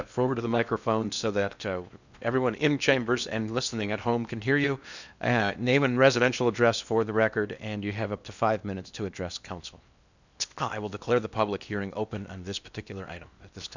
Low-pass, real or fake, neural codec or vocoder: 7.2 kHz; fake; codec, 16 kHz in and 24 kHz out, 0.8 kbps, FocalCodec, streaming, 65536 codes